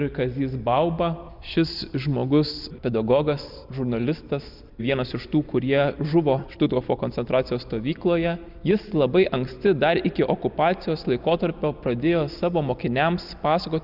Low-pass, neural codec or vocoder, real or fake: 5.4 kHz; none; real